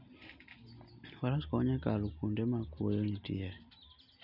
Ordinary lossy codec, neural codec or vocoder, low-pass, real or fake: none; none; 5.4 kHz; real